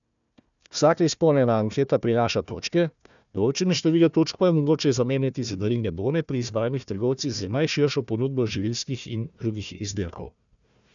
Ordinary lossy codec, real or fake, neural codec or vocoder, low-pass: none; fake; codec, 16 kHz, 1 kbps, FunCodec, trained on Chinese and English, 50 frames a second; 7.2 kHz